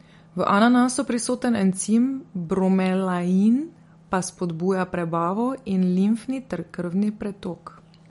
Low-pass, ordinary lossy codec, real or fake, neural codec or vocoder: 19.8 kHz; MP3, 48 kbps; real; none